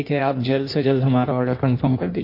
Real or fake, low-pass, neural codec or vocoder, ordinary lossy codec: fake; 5.4 kHz; codec, 16 kHz in and 24 kHz out, 1.1 kbps, FireRedTTS-2 codec; MP3, 32 kbps